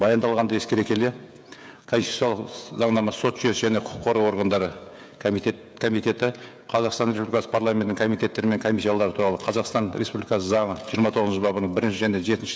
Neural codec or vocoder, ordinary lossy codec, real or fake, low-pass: codec, 16 kHz, 16 kbps, FreqCodec, smaller model; none; fake; none